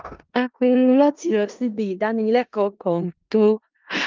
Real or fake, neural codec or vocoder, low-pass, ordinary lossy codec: fake; codec, 16 kHz in and 24 kHz out, 0.4 kbps, LongCat-Audio-Codec, four codebook decoder; 7.2 kHz; Opus, 24 kbps